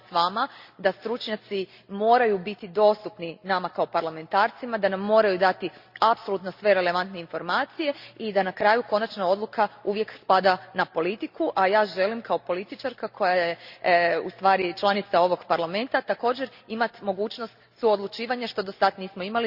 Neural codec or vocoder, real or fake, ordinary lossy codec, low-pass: none; real; Opus, 64 kbps; 5.4 kHz